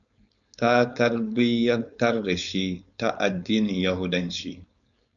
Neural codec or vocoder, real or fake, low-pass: codec, 16 kHz, 4.8 kbps, FACodec; fake; 7.2 kHz